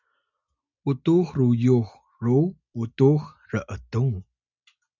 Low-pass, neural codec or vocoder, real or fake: 7.2 kHz; none; real